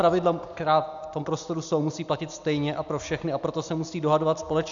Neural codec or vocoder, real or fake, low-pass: none; real; 7.2 kHz